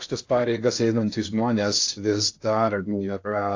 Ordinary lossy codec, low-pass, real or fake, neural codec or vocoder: AAC, 48 kbps; 7.2 kHz; fake; codec, 16 kHz in and 24 kHz out, 0.6 kbps, FocalCodec, streaming, 2048 codes